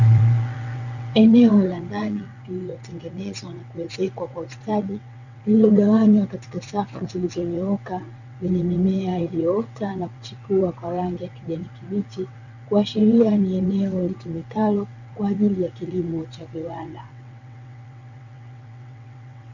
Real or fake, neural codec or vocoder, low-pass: fake; vocoder, 22.05 kHz, 80 mel bands, WaveNeXt; 7.2 kHz